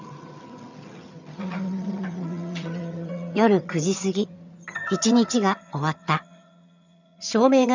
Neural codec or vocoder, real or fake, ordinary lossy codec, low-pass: vocoder, 22.05 kHz, 80 mel bands, HiFi-GAN; fake; none; 7.2 kHz